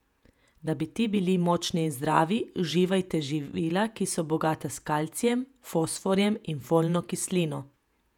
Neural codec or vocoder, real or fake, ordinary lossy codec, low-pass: vocoder, 48 kHz, 128 mel bands, Vocos; fake; none; 19.8 kHz